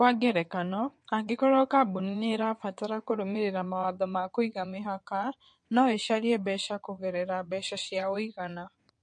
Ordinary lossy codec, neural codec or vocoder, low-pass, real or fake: MP3, 48 kbps; vocoder, 22.05 kHz, 80 mel bands, WaveNeXt; 9.9 kHz; fake